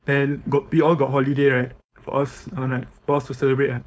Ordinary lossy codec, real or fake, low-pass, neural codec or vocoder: none; fake; none; codec, 16 kHz, 4.8 kbps, FACodec